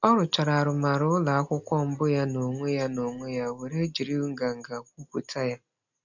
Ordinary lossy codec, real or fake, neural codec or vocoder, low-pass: none; real; none; 7.2 kHz